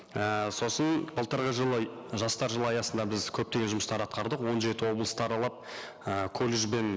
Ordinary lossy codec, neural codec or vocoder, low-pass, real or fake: none; none; none; real